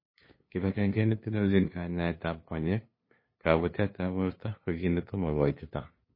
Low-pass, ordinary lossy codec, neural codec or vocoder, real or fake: 5.4 kHz; MP3, 24 kbps; codec, 16 kHz, 2 kbps, FunCodec, trained on LibriTTS, 25 frames a second; fake